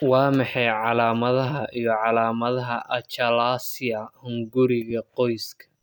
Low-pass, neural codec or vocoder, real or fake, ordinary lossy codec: none; none; real; none